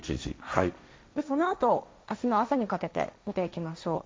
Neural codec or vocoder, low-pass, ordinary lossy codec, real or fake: codec, 16 kHz, 1.1 kbps, Voila-Tokenizer; none; none; fake